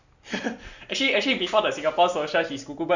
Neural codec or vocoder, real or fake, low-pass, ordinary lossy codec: none; real; 7.2 kHz; none